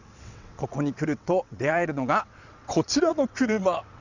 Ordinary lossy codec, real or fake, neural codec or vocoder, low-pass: Opus, 64 kbps; fake; vocoder, 22.05 kHz, 80 mel bands, WaveNeXt; 7.2 kHz